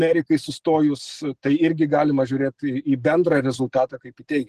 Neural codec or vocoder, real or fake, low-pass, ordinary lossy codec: none; real; 14.4 kHz; Opus, 16 kbps